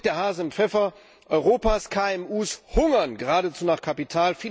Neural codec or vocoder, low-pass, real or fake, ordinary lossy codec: none; none; real; none